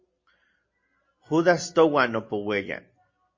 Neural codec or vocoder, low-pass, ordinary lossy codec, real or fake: none; 7.2 kHz; MP3, 32 kbps; real